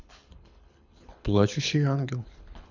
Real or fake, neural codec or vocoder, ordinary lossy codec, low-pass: fake; codec, 24 kHz, 6 kbps, HILCodec; none; 7.2 kHz